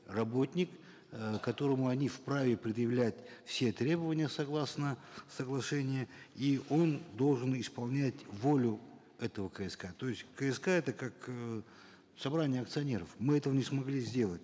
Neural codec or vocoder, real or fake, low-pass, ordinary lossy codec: none; real; none; none